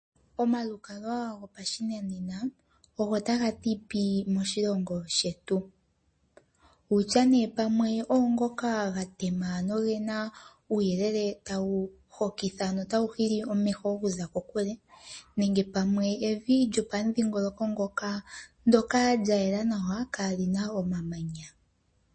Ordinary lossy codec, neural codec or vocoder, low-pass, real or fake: MP3, 32 kbps; none; 9.9 kHz; real